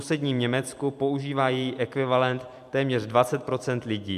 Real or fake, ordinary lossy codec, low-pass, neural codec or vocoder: fake; MP3, 96 kbps; 14.4 kHz; vocoder, 44.1 kHz, 128 mel bands every 256 samples, BigVGAN v2